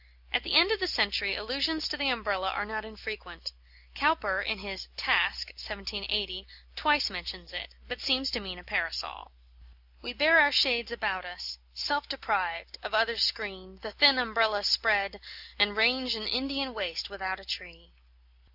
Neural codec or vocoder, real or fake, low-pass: none; real; 5.4 kHz